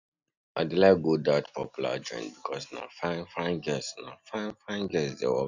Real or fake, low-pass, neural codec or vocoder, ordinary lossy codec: real; 7.2 kHz; none; none